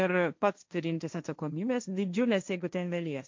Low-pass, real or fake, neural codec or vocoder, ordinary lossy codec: 7.2 kHz; fake; codec, 16 kHz, 1.1 kbps, Voila-Tokenizer; MP3, 64 kbps